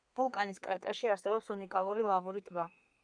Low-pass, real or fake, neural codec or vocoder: 9.9 kHz; fake; codec, 32 kHz, 1.9 kbps, SNAC